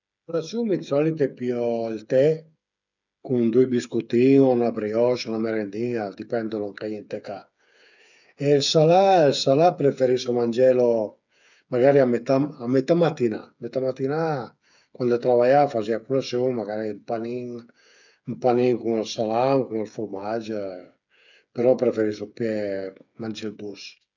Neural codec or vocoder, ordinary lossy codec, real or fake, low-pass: codec, 16 kHz, 8 kbps, FreqCodec, smaller model; none; fake; 7.2 kHz